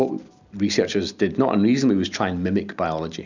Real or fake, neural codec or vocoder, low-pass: real; none; 7.2 kHz